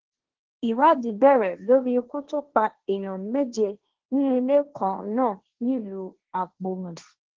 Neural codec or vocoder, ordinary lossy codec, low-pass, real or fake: codec, 16 kHz, 1.1 kbps, Voila-Tokenizer; Opus, 16 kbps; 7.2 kHz; fake